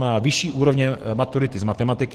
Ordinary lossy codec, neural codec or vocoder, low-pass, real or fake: Opus, 16 kbps; codec, 44.1 kHz, 7.8 kbps, DAC; 14.4 kHz; fake